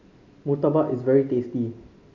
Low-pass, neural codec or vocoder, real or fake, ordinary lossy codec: 7.2 kHz; none; real; MP3, 48 kbps